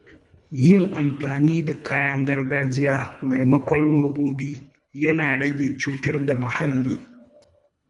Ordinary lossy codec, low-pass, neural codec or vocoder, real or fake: none; 10.8 kHz; codec, 24 kHz, 1.5 kbps, HILCodec; fake